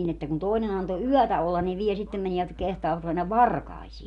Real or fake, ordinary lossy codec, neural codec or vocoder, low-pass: real; MP3, 96 kbps; none; 10.8 kHz